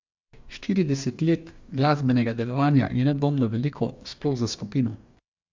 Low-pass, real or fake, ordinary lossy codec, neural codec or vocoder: 7.2 kHz; fake; MP3, 64 kbps; codec, 44.1 kHz, 2.6 kbps, DAC